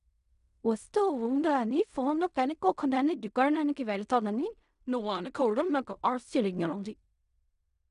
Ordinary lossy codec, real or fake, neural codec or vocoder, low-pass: none; fake; codec, 16 kHz in and 24 kHz out, 0.4 kbps, LongCat-Audio-Codec, fine tuned four codebook decoder; 10.8 kHz